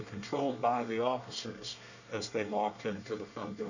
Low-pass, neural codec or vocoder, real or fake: 7.2 kHz; codec, 24 kHz, 1 kbps, SNAC; fake